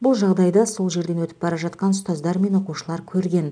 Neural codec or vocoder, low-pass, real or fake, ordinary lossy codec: none; 9.9 kHz; real; none